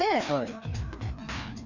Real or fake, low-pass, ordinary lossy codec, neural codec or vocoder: fake; 7.2 kHz; none; codec, 16 kHz, 2 kbps, FreqCodec, larger model